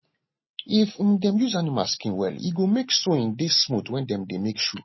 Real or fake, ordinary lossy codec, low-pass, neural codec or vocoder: real; MP3, 24 kbps; 7.2 kHz; none